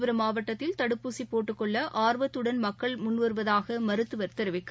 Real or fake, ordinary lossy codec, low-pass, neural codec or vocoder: real; none; none; none